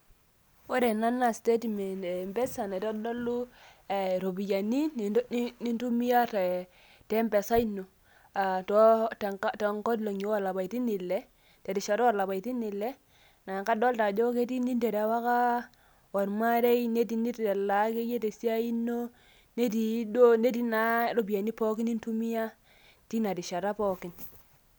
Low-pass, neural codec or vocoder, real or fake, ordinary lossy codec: none; none; real; none